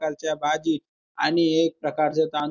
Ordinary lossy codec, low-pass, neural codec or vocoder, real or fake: none; 7.2 kHz; none; real